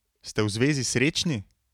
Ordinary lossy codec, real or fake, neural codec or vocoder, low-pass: none; real; none; 19.8 kHz